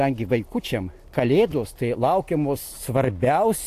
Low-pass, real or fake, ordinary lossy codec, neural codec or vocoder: 14.4 kHz; real; AAC, 64 kbps; none